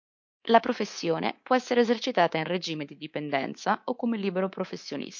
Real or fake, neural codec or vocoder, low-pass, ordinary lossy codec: fake; codec, 24 kHz, 3.1 kbps, DualCodec; 7.2 kHz; MP3, 48 kbps